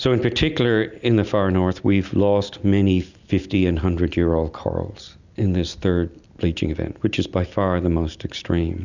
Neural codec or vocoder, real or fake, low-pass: none; real; 7.2 kHz